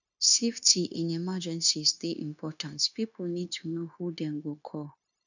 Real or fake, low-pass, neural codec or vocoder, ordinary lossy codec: fake; 7.2 kHz; codec, 16 kHz, 0.9 kbps, LongCat-Audio-Codec; none